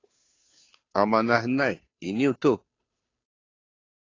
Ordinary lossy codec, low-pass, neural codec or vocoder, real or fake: AAC, 32 kbps; 7.2 kHz; codec, 16 kHz, 2 kbps, FunCodec, trained on Chinese and English, 25 frames a second; fake